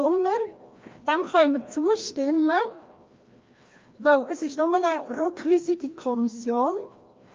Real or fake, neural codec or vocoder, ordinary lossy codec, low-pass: fake; codec, 16 kHz, 1 kbps, FreqCodec, larger model; Opus, 24 kbps; 7.2 kHz